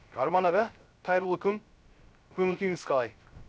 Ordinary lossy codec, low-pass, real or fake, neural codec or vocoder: none; none; fake; codec, 16 kHz, 0.3 kbps, FocalCodec